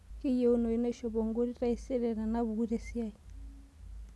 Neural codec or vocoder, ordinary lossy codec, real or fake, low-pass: none; none; real; none